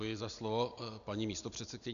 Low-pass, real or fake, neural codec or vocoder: 7.2 kHz; real; none